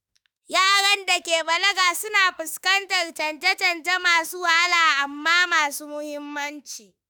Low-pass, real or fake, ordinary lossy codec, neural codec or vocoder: none; fake; none; autoencoder, 48 kHz, 32 numbers a frame, DAC-VAE, trained on Japanese speech